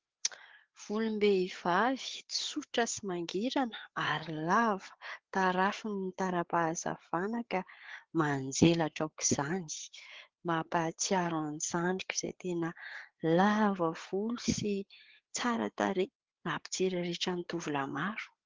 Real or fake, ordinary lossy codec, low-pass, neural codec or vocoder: fake; Opus, 16 kbps; 7.2 kHz; codec, 16 kHz, 4 kbps, FreqCodec, larger model